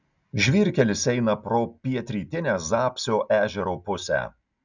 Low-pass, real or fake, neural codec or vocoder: 7.2 kHz; real; none